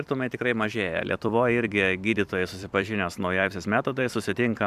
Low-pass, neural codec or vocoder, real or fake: 14.4 kHz; vocoder, 44.1 kHz, 128 mel bands every 256 samples, BigVGAN v2; fake